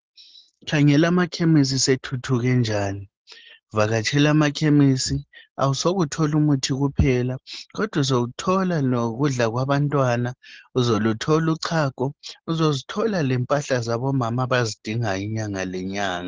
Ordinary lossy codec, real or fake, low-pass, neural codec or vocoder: Opus, 16 kbps; real; 7.2 kHz; none